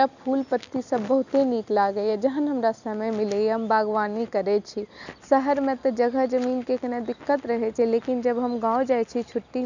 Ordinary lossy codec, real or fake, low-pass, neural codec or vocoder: none; real; 7.2 kHz; none